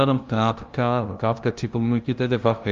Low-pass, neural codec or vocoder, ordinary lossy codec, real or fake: 7.2 kHz; codec, 16 kHz, 0.5 kbps, FunCodec, trained on LibriTTS, 25 frames a second; Opus, 32 kbps; fake